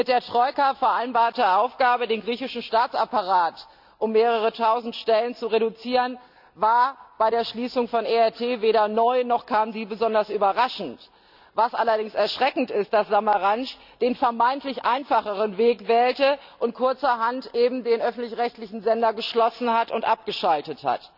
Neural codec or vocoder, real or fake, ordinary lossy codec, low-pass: none; real; none; 5.4 kHz